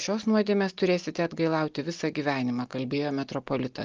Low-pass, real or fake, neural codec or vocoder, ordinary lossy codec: 7.2 kHz; real; none; Opus, 32 kbps